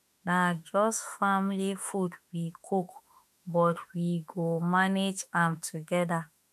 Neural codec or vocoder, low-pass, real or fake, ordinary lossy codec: autoencoder, 48 kHz, 32 numbers a frame, DAC-VAE, trained on Japanese speech; 14.4 kHz; fake; none